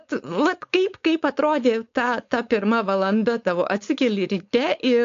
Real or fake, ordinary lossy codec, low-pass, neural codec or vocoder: fake; AAC, 48 kbps; 7.2 kHz; codec, 16 kHz, 4.8 kbps, FACodec